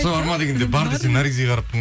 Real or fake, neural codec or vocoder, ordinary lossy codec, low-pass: real; none; none; none